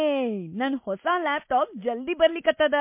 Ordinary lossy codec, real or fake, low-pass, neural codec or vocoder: MP3, 24 kbps; real; 3.6 kHz; none